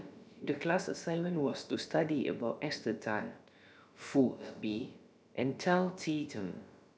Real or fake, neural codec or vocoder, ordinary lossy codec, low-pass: fake; codec, 16 kHz, about 1 kbps, DyCAST, with the encoder's durations; none; none